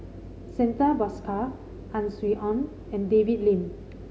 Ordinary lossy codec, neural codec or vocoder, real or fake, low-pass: none; none; real; none